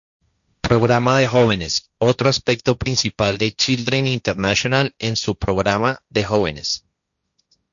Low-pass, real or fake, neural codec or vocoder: 7.2 kHz; fake; codec, 16 kHz, 1.1 kbps, Voila-Tokenizer